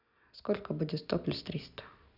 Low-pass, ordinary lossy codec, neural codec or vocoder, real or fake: 5.4 kHz; none; none; real